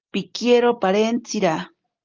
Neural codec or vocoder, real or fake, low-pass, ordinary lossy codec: none; real; 7.2 kHz; Opus, 32 kbps